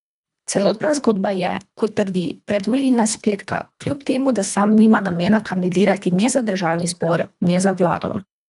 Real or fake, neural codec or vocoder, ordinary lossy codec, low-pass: fake; codec, 24 kHz, 1.5 kbps, HILCodec; none; 10.8 kHz